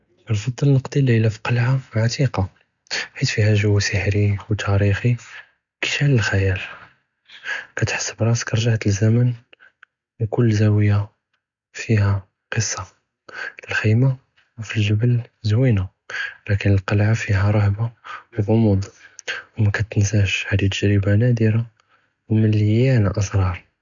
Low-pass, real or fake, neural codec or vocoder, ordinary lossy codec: 7.2 kHz; real; none; none